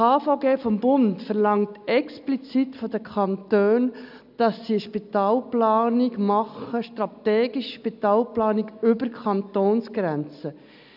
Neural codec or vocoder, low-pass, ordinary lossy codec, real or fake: none; 5.4 kHz; none; real